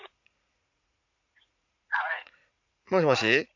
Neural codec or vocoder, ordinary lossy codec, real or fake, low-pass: vocoder, 44.1 kHz, 80 mel bands, Vocos; none; fake; 7.2 kHz